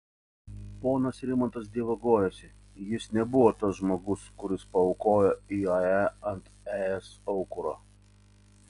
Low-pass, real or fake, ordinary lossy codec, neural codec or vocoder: 10.8 kHz; real; MP3, 64 kbps; none